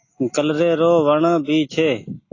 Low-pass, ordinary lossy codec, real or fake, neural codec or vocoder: 7.2 kHz; AAC, 32 kbps; real; none